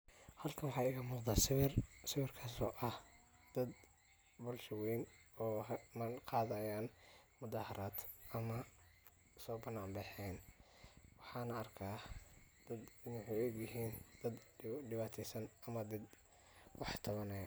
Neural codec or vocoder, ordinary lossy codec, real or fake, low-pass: none; none; real; none